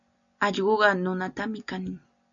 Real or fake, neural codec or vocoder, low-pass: real; none; 7.2 kHz